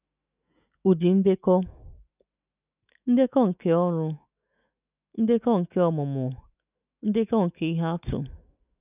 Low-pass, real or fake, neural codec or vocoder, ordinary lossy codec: 3.6 kHz; fake; autoencoder, 48 kHz, 128 numbers a frame, DAC-VAE, trained on Japanese speech; none